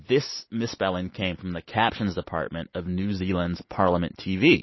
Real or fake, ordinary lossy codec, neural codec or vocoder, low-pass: real; MP3, 24 kbps; none; 7.2 kHz